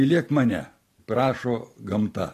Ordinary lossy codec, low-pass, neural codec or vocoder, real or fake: AAC, 48 kbps; 14.4 kHz; none; real